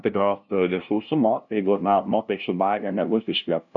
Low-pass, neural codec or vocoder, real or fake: 7.2 kHz; codec, 16 kHz, 0.5 kbps, FunCodec, trained on LibriTTS, 25 frames a second; fake